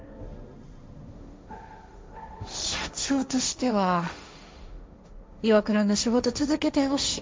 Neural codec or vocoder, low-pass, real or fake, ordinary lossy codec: codec, 16 kHz, 1.1 kbps, Voila-Tokenizer; none; fake; none